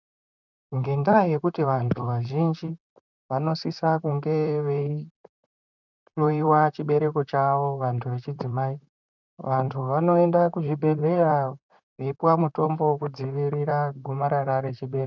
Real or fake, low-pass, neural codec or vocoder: fake; 7.2 kHz; vocoder, 44.1 kHz, 128 mel bands, Pupu-Vocoder